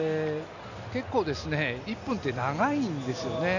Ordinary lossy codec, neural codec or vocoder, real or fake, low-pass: none; none; real; 7.2 kHz